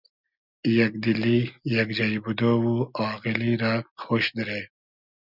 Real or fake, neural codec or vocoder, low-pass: real; none; 5.4 kHz